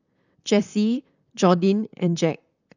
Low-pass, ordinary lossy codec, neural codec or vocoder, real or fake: 7.2 kHz; none; vocoder, 44.1 kHz, 128 mel bands, Pupu-Vocoder; fake